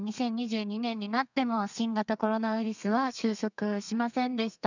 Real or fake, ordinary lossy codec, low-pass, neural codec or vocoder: fake; none; 7.2 kHz; codec, 32 kHz, 1.9 kbps, SNAC